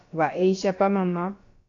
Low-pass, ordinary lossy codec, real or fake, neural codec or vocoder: 7.2 kHz; AAC, 48 kbps; fake; codec, 16 kHz, about 1 kbps, DyCAST, with the encoder's durations